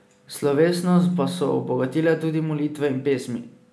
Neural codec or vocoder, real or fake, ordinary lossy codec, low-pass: none; real; none; none